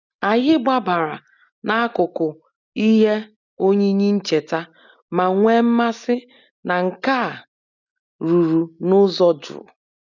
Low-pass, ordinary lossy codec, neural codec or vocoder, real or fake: 7.2 kHz; none; none; real